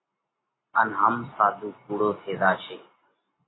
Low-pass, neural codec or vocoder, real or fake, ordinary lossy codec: 7.2 kHz; none; real; AAC, 16 kbps